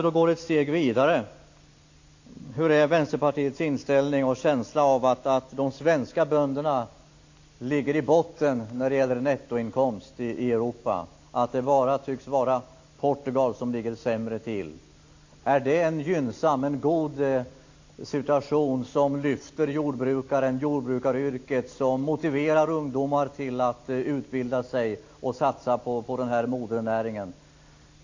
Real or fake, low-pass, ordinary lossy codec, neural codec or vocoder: real; 7.2 kHz; AAC, 48 kbps; none